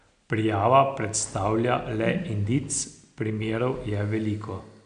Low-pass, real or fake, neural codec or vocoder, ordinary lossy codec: 9.9 kHz; real; none; none